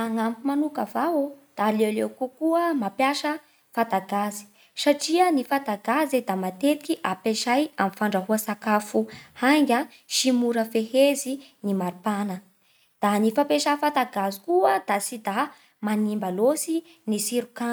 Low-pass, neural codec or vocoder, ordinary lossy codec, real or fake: none; none; none; real